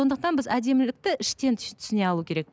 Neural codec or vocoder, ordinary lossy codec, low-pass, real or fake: none; none; none; real